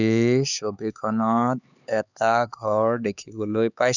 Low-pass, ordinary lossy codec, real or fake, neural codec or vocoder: 7.2 kHz; none; fake; codec, 16 kHz, 4 kbps, X-Codec, HuBERT features, trained on LibriSpeech